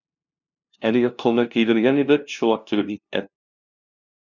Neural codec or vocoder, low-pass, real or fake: codec, 16 kHz, 0.5 kbps, FunCodec, trained on LibriTTS, 25 frames a second; 7.2 kHz; fake